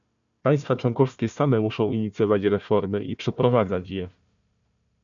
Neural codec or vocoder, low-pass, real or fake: codec, 16 kHz, 1 kbps, FunCodec, trained on Chinese and English, 50 frames a second; 7.2 kHz; fake